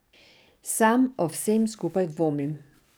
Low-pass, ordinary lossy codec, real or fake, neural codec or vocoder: none; none; fake; codec, 44.1 kHz, 7.8 kbps, DAC